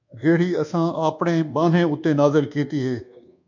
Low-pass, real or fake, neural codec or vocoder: 7.2 kHz; fake; codec, 24 kHz, 1.2 kbps, DualCodec